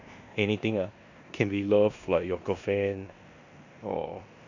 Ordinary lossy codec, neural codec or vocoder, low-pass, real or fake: none; codec, 16 kHz in and 24 kHz out, 0.9 kbps, LongCat-Audio-Codec, four codebook decoder; 7.2 kHz; fake